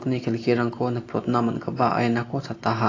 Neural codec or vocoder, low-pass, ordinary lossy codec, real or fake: none; 7.2 kHz; AAC, 32 kbps; real